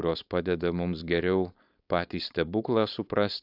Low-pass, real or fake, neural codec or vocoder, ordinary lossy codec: 5.4 kHz; fake; codec, 16 kHz, 4.8 kbps, FACodec; AAC, 48 kbps